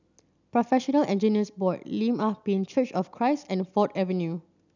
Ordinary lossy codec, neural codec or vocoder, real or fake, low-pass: none; none; real; 7.2 kHz